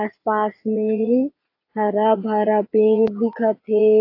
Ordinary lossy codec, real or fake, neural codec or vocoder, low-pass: AAC, 32 kbps; fake; vocoder, 22.05 kHz, 80 mel bands, Vocos; 5.4 kHz